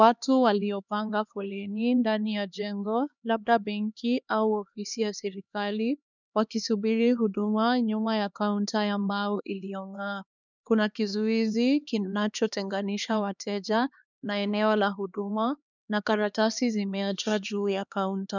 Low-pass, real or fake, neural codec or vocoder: 7.2 kHz; fake; codec, 16 kHz, 2 kbps, X-Codec, HuBERT features, trained on LibriSpeech